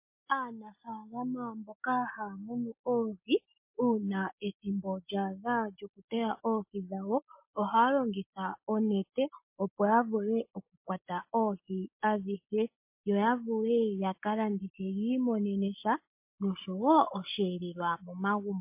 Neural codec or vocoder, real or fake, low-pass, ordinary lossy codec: none; real; 3.6 kHz; MP3, 24 kbps